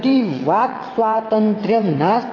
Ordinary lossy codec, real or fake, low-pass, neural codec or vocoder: AAC, 32 kbps; fake; 7.2 kHz; vocoder, 22.05 kHz, 80 mel bands, WaveNeXt